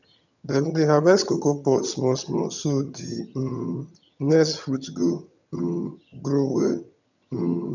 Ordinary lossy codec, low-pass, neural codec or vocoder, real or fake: none; 7.2 kHz; vocoder, 22.05 kHz, 80 mel bands, HiFi-GAN; fake